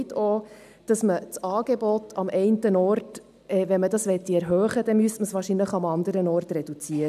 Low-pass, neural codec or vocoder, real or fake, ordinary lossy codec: 14.4 kHz; none; real; none